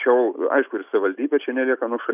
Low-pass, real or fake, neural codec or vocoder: 3.6 kHz; real; none